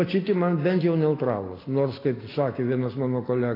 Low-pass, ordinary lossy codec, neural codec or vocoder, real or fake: 5.4 kHz; AAC, 24 kbps; none; real